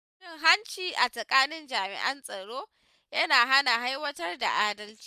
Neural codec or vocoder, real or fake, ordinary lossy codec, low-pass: none; real; none; 14.4 kHz